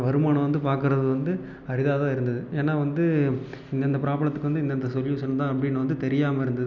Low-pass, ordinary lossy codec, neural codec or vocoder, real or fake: 7.2 kHz; none; none; real